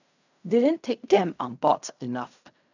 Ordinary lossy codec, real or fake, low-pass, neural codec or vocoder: none; fake; 7.2 kHz; codec, 16 kHz in and 24 kHz out, 0.4 kbps, LongCat-Audio-Codec, fine tuned four codebook decoder